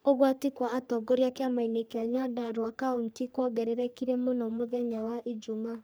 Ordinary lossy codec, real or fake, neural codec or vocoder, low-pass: none; fake; codec, 44.1 kHz, 3.4 kbps, Pupu-Codec; none